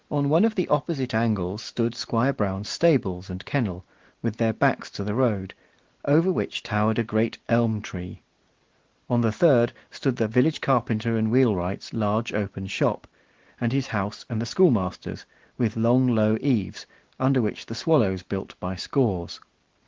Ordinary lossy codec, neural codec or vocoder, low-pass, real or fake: Opus, 16 kbps; none; 7.2 kHz; real